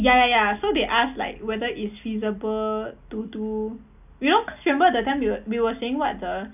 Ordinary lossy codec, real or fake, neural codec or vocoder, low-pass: none; real; none; 3.6 kHz